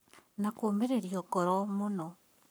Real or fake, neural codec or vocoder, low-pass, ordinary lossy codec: fake; codec, 44.1 kHz, 7.8 kbps, Pupu-Codec; none; none